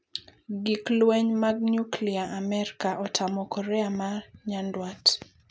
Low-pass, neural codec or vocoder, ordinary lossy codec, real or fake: none; none; none; real